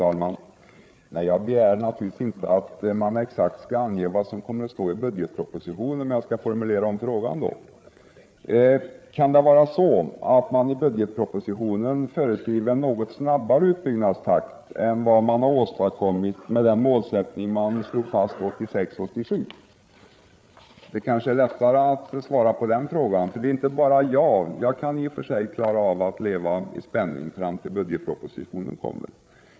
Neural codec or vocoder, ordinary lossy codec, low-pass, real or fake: codec, 16 kHz, 8 kbps, FreqCodec, larger model; none; none; fake